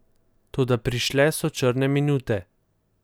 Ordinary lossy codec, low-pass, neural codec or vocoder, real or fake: none; none; none; real